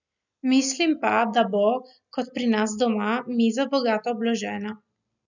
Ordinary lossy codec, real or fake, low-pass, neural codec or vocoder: none; real; 7.2 kHz; none